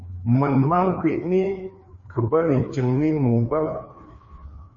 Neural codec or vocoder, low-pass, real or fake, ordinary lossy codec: codec, 16 kHz, 2 kbps, FreqCodec, larger model; 7.2 kHz; fake; MP3, 32 kbps